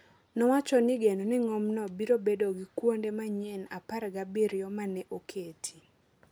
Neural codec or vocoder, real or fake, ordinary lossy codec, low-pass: none; real; none; none